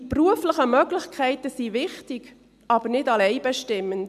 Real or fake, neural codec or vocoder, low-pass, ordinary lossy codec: real; none; 14.4 kHz; MP3, 96 kbps